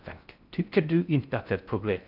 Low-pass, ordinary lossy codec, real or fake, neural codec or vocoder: 5.4 kHz; none; fake; codec, 16 kHz in and 24 kHz out, 0.6 kbps, FocalCodec, streaming, 4096 codes